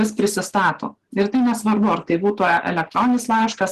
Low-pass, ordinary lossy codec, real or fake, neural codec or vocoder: 14.4 kHz; Opus, 16 kbps; real; none